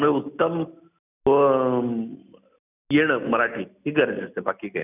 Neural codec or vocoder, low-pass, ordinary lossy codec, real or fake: none; 3.6 kHz; none; real